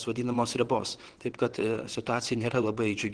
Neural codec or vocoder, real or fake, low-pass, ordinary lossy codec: vocoder, 22.05 kHz, 80 mel bands, WaveNeXt; fake; 9.9 kHz; Opus, 16 kbps